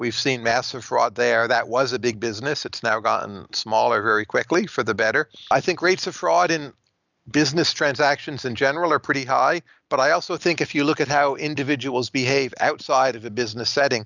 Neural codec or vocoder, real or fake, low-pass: none; real; 7.2 kHz